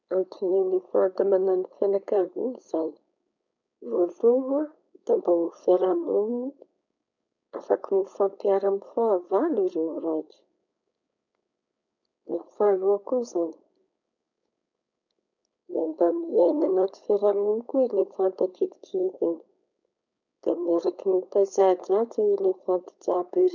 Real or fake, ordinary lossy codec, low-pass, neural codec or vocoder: fake; none; 7.2 kHz; codec, 16 kHz, 4.8 kbps, FACodec